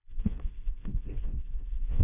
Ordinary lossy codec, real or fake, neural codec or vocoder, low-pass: none; fake; codec, 16 kHz, 1 kbps, FreqCodec, smaller model; 3.6 kHz